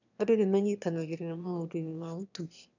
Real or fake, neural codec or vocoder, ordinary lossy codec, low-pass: fake; autoencoder, 22.05 kHz, a latent of 192 numbers a frame, VITS, trained on one speaker; none; 7.2 kHz